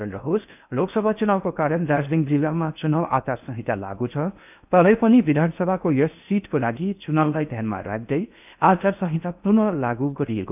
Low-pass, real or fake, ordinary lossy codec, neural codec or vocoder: 3.6 kHz; fake; none; codec, 16 kHz in and 24 kHz out, 0.6 kbps, FocalCodec, streaming, 4096 codes